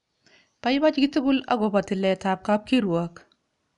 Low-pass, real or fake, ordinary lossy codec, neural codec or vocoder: 10.8 kHz; real; none; none